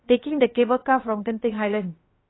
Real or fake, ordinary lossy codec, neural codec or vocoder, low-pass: fake; AAC, 16 kbps; codec, 16 kHz, about 1 kbps, DyCAST, with the encoder's durations; 7.2 kHz